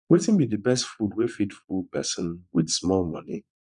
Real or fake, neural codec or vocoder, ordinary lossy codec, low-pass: fake; vocoder, 22.05 kHz, 80 mel bands, Vocos; none; 9.9 kHz